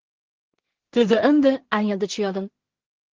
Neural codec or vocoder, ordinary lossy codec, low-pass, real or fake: codec, 16 kHz in and 24 kHz out, 0.4 kbps, LongCat-Audio-Codec, two codebook decoder; Opus, 16 kbps; 7.2 kHz; fake